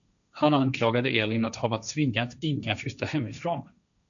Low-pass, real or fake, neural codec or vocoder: 7.2 kHz; fake; codec, 16 kHz, 1.1 kbps, Voila-Tokenizer